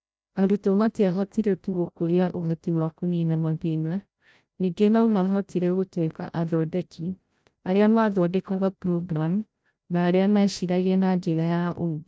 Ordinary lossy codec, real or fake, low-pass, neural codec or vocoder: none; fake; none; codec, 16 kHz, 0.5 kbps, FreqCodec, larger model